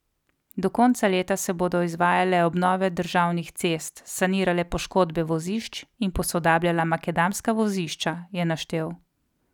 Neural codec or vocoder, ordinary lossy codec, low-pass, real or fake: none; none; 19.8 kHz; real